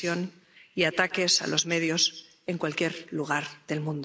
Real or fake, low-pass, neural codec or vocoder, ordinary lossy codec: real; none; none; none